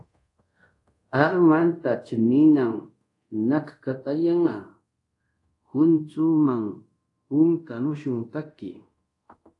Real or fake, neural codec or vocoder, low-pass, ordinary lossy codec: fake; codec, 24 kHz, 0.5 kbps, DualCodec; 10.8 kHz; AAC, 48 kbps